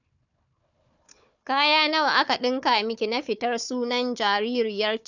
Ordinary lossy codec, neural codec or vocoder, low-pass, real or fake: none; codec, 16 kHz, 4 kbps, FunCodec, trained on Chinese and English, 50 frames a second; 7.2 kHz; fake